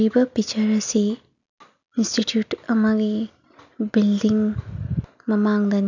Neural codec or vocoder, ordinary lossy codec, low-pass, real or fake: none; none; 7.2 kHz; real